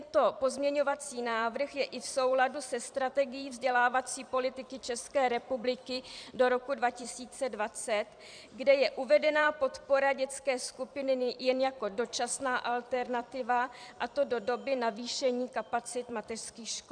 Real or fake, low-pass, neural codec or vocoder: fake; 9.9 kHz; vocoder, 44.1 kHz, 128 mel bands every 256 samples, BigVGAN v2